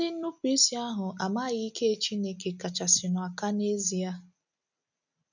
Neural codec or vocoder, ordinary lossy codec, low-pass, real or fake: none; none; 7.2 kHz; real